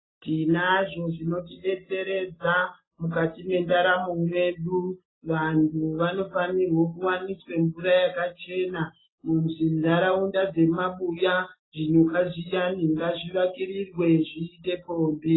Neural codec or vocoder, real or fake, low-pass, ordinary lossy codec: none; real; 7.2 kHz; AAC, 16 kbps